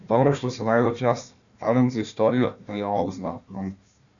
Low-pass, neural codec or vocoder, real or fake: 7.2 kHz; codec, 16 kHz, 1 kbps, FunCodec, trained on Chinese and English, 50 frames a second; fake